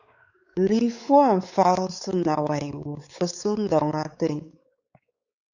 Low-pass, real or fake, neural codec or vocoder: 7.2 kHz; fake; codec, 16 kHz, 4 kbps, X-Codec, WavLM features, trained on Multilingual LibriSpeech